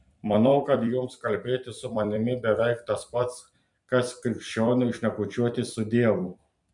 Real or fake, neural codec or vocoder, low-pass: fake; vocoder, 44.1 kHz, 128 mel bands every 256 samples, BigVGAN v2; 10.8 kHz